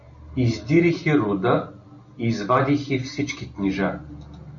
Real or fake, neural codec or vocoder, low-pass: real; none; 7.2 kHz